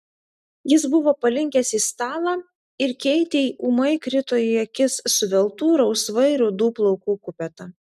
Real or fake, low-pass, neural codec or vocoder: real; 14.4 kHz; none